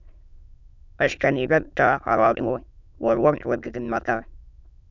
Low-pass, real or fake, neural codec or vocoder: 7.2 kHz; fake; autoencoder, 22.05 kHz, a latent of 192 numbers a frame, VITS, trained on many speakers